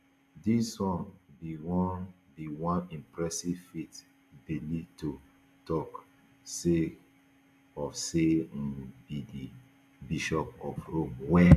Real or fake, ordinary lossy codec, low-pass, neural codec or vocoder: real; AAC, 96 kbps; 14.4 kHz; none